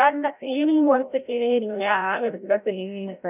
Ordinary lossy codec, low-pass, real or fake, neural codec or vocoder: none; 3.6 kHz; fake; codec, 16 kHz, 0.5 kbps, FreqCodec, larger model